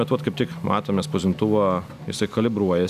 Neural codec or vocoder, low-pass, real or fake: none; 14.4 kHz; real